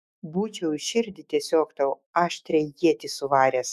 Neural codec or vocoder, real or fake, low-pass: autoencoder, 48 kHz, 128 numbers a frame, DAC-VAE, trained on Japanese speech; fake; 14.4 kHz